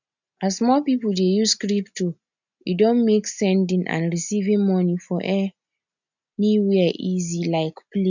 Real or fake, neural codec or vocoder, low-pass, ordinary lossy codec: real; none; 7.2 kHz; none